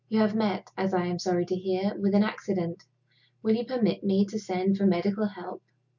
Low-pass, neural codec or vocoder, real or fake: 7.2 kHz; none; real